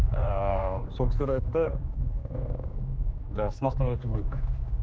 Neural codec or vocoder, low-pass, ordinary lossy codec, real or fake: codec, 16 kHz, 2 kbps, X-Codec, HuBERT features, trained on general audio; none; none; fake